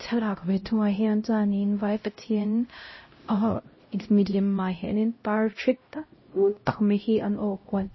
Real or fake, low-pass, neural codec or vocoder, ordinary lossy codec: fake; 7.2 kHz; codec, 16 kHz, 0.5 kbps, X-Codec, HuBERT features, trained on LibriSpeech; MP3, 24 kbps